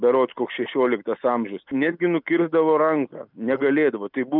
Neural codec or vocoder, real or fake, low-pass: none; real; 5.4 kHz